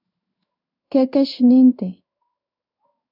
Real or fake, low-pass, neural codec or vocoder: fake; 5.4 kHz; codec, 16 kHz in and 24 kHz out, 1 kbps, XY-Tokenizer